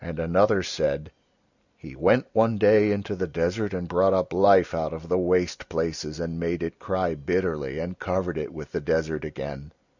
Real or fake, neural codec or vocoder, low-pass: real; none; 7.2 kHz